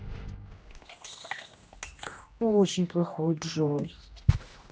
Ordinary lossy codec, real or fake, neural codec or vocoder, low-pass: none; fake; codec, 16 kHz, 1 kbps, X-Codec, HuBERT features, trained on general audio; none